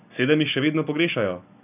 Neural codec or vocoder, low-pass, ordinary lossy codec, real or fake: none; 3.6 kHz; none; real